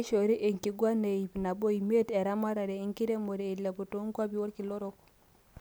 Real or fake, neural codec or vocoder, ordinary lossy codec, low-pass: real; none; none; none